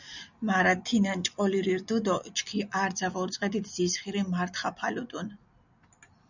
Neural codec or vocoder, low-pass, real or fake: none; 7.2 kHz; real